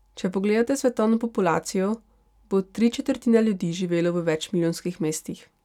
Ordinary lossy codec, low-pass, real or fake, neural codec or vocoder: none; 19.8 kHz; real; none